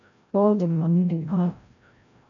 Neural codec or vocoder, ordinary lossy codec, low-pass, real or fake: codec, 16 kHz, 0.5 kbps, FreqCodec, larger model; MP3, 96 kbps; 7.2 kHz; fake